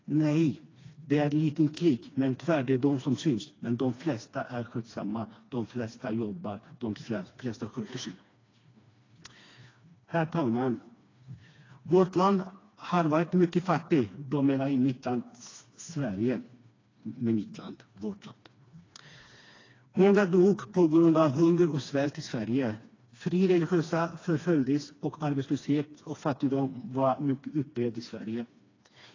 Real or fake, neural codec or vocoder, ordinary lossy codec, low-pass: fake; codec, 16 kHz, 2 kbps, FreqCodec, smaller model; AAC, 32 kbps; 7.2 kHz